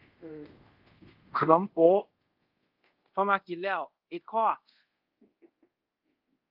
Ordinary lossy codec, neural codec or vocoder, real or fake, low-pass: Opus, 32 kbps; codec, 24 kHz, 0.5 kbps, DualCodec; fake; 5.4 kHz